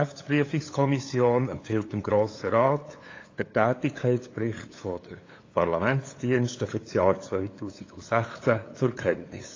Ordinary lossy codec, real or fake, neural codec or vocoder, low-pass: AAC, 32 kbps; fake; codec, 16 kHz, 8 kbps, FunCodec, trained on LibriTTS, 25 frames a second; 7.2 kHz